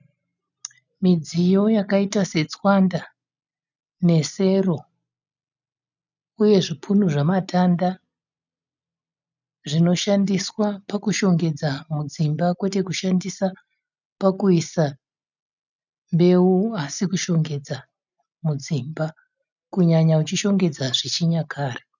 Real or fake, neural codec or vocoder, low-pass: real; none; 7.2 kHz